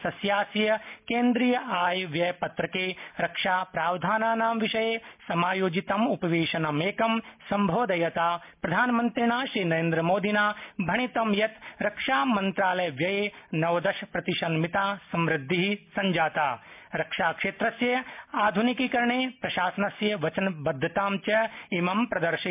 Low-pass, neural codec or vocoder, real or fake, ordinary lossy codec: 3.6 kHz; none; real; MP3, 32 kbps